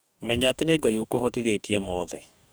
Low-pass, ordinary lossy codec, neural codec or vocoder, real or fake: none; none; codec, 44.1 kHz, 2.6 kbps, DAC; fake